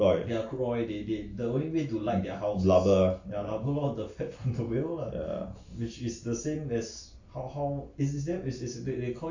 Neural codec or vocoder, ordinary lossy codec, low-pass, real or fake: none; AAC, 48 kbps; 7.2 kHz; real